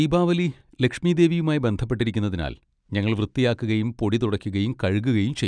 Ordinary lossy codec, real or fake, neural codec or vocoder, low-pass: none; real; none; 9.9 kHz